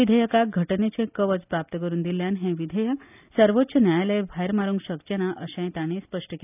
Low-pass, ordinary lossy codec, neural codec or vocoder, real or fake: 3.6 kHz; none; none; real